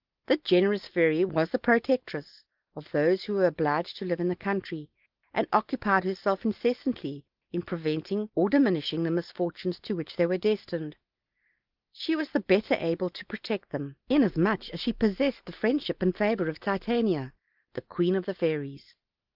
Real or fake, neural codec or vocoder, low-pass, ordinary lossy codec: real; none; 5.4 kHz; Opus, 32 kbps